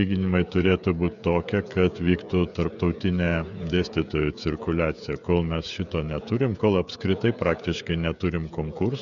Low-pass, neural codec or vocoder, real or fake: 7.2 kHz; codec, 16 kHz, 16 kbps, FreqCodec, smaller model; fake